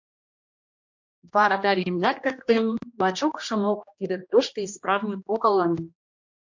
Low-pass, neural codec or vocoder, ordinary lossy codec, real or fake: 7.2 kHz; codec, 16 kHz, 1 kbps, X-Codec, HuBERT features, trained on general audio; MP3, 48 kbps; fake